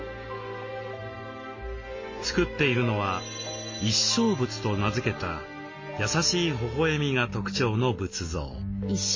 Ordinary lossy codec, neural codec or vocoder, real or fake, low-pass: MP3, 32 kbps; none; real; 7.2 kHz